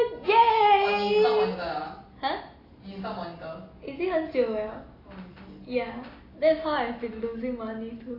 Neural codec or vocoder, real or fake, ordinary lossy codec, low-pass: none; real; AAC, 24 kbps; 5.4 kHz